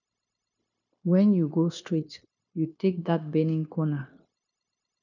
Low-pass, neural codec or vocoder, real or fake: 7.2 kHz; codec, 16 kHz, 0.9 kbps, LongCat-Audio-Codec; fake